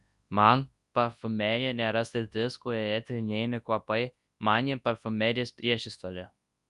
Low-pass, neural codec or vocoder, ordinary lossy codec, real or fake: 10.8 kHz; codec, 24 kHz, 0.9 kbps, WavTokenizer, large speech release; Opus, 64 kbps; fake